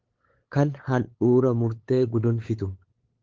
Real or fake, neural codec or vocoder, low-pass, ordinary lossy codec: fake; codec, 16 kHz, 8 kbps, FunCodec, trained on LibriTTS, 25 frames a second; 7.2 kHz; Opus, 16 kbps